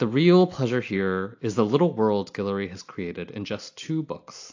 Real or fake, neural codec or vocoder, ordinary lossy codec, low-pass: real; none; AAC, 48 kbps; 7.2 kHz